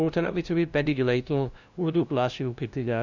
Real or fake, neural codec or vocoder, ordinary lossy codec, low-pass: fake; codec, 16 kHz, 0.5 kbps, FunCodec, trained on LibriTTS, 25 frames a second; none; 7.2 kHz